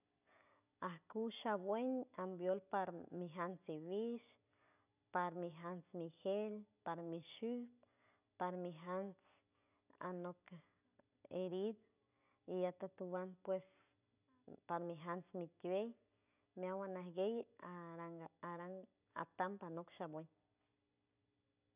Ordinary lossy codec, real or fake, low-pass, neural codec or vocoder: none; real; 3.6 kHz; none